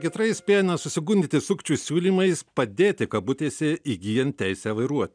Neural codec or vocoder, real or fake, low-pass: none; real; 9.9 kHz